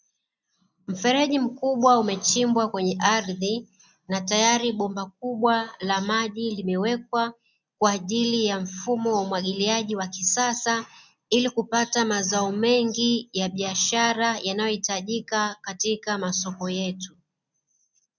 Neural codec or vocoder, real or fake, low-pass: none; real; 7.2 kHz